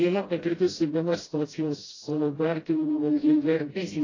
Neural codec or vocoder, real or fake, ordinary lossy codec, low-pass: codec, 16 kHz, 0.5 kbps, FreqCodec, smaller model; fake; AAC, 32 kbps; 7.2 kHz